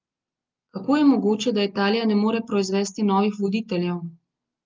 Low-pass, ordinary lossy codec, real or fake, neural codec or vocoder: 7.2 kHz; Opus, 32 kbps; real; none